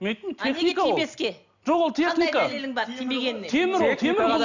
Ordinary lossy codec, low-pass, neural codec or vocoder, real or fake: none; 7.2 kHz; none; real